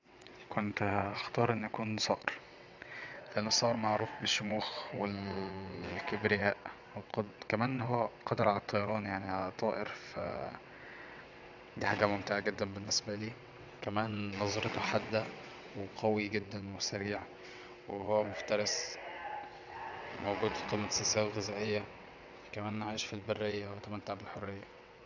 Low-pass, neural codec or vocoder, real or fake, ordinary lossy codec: 7.2 kHz; vocoder, 22.05 kHz, 80 mel bands, WaveNeXt; fake; none